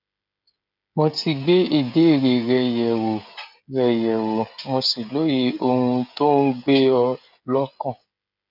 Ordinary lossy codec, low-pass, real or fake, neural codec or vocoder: none; 5.4 kHz; fake; codec, 16 kHz, 16 kbps, FreqCodec, smaller model